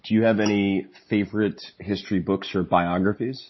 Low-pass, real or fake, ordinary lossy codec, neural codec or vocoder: 7.2 kHz; real; MP3, 24 kbps; none